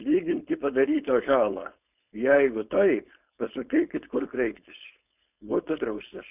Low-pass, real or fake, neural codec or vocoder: 3.6 kHz; fake; codec, 16 kHz, 4.8 kbps, FACodec